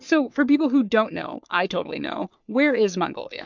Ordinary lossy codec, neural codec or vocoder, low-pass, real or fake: MP3, 64 kbps; codec, 44.1 kHz, 3.4 kbps, Pupu-Codec; 7.2 kHz; fake